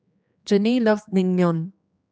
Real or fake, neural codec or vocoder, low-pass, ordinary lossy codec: fake; codec, 16 kHz, 2 kbps, X-Codec, HuBERT features, trained on general audio; none; none